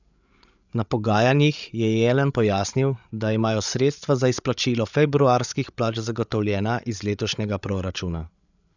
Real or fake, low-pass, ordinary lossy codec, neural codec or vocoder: fake; 7.2 kHz; none; codec, 16 kHz, 8 kbps, FreqCodec, larger model